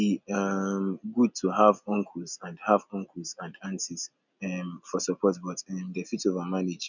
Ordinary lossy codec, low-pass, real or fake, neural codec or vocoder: none; 7.2 kHz; real; none